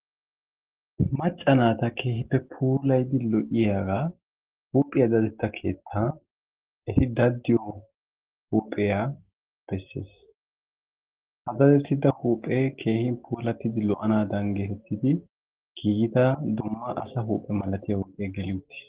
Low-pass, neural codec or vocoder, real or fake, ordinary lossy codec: 3.6 kHz; none; real; Opus, 16 kbps